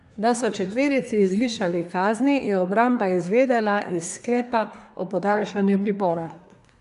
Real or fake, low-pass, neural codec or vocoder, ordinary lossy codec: fake; 10.8 kHz; codec, 24 kHz, 1 kbps, SNAC; none